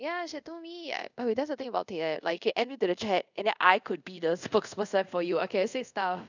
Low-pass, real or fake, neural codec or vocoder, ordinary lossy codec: 7.2 kHz; fake; codec, 24 kHz, 0.5 kbps, DualCodec; none